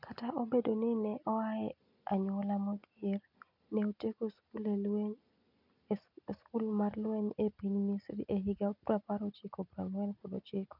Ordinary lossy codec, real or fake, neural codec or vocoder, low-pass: none; real; none; 5.4 kHz